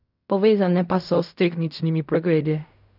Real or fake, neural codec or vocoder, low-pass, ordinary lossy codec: fake; codec, 16 kHz in and 24 kHz out, 0.4 kbps, LongCat-Audio-Codec, fine tuned four codebook decoder; 5.4 kHz; none